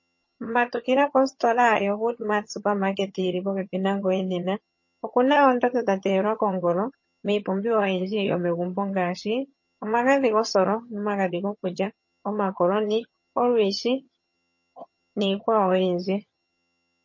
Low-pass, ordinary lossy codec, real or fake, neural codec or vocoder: 7.2 kHz; MP3, 32 kbps; fake; vocoder, 22.05 kHz, 80 mel bands, HiFi-GAN